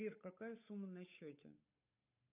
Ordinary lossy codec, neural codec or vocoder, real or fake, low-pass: AAC, 32 kbps; codec, 16 kHz, 8 kbps, FunCodec, trained on Chinese and English, 25 frames a second; fake; 3.6 kHz